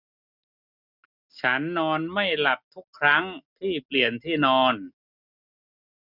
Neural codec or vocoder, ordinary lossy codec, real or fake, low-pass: none; none; real; 5.4 kHz